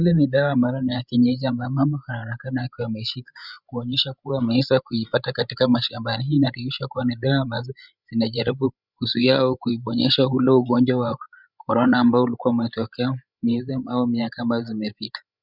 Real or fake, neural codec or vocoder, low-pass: fake; codec, 16 kHz, 16 kbps, FreqCodec, larger model; 5.4 kHz